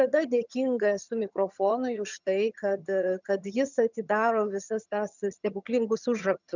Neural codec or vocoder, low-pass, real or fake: vocoder, 22.05 kHz, 80 mel bands, HiFi-GAN; 7.2 kHz; fake